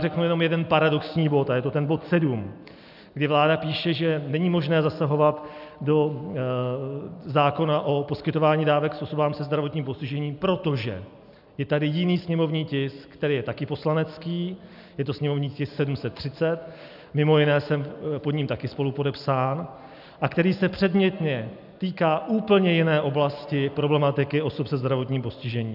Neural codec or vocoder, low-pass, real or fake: none; 5.4 kHz; real